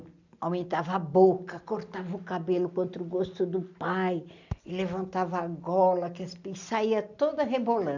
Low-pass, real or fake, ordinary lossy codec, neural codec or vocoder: 7.2 kHz; real; none; none